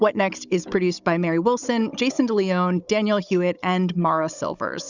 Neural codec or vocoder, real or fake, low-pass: codec, 16 kHz, 16 kbps, FunCodec, trained on Chinese and English, 50 frames a second; fake; 7.2 kHz